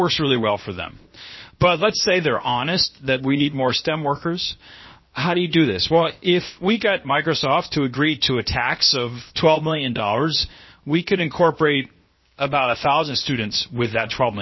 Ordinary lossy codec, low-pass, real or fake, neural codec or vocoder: MP3, 24 kbps; 7.2 kHz; fake; codec, 16 kHz, 0.7 kbps, FocalCodec